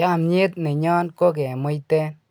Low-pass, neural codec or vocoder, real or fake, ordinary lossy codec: none; none; real; none